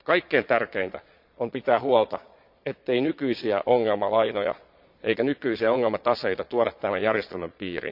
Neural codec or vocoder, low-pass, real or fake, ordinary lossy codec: vocoder, 22.05 kHz, 80 mel bands, Vocos; 5.4 kHz; fake; MP3, 48 kbps